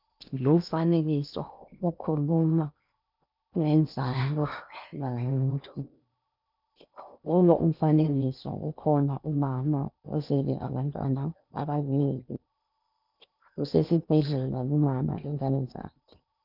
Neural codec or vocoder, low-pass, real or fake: codec, 16 kHz in and 24 kHz out, 0.8 kbps, FocalCodec, streaming, 65536 codes; 5.4 kHz; fake